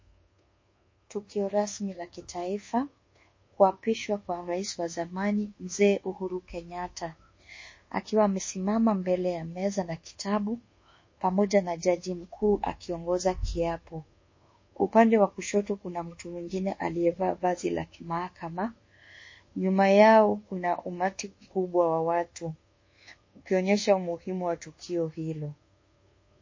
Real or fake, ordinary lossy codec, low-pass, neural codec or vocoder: fake; MP3, 32 kbps; 7.2 kHz; codec, 24 kHz, 1.2 kbps, DualCodec